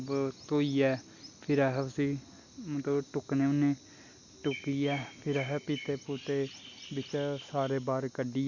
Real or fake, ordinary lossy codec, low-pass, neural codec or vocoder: real; Opus, 64 kbps; 7.2 kHz; none